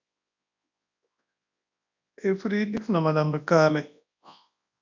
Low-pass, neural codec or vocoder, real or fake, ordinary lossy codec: 7.2 kHz; codec, 24 kHz, 0.9 kbps, WavTokenizer, large speech release; fake; MP3, 64 kbps